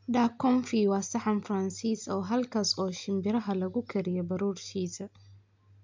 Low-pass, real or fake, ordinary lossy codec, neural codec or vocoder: 7.2 kHz; real; MP3, 64 kbps; none